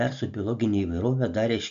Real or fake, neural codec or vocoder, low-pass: real; none; 7.2 kHz